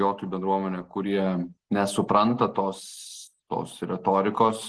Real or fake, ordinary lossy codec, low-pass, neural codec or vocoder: real; Opus, 16 kbps; 10.8 kHz; none